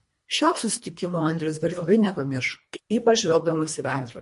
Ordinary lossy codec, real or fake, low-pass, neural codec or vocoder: MP3, 48 kbps; fake; 10.8 kHz; codec, 24 kHz, 1.5 kbps, HILCodec